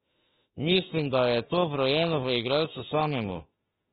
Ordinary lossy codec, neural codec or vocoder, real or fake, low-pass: AAC, 16 kbps; autoencoder, 48 kHz, 32 numbers a frame, DAC-VAE, trained on Japanese speech; fake; 19.8 kHz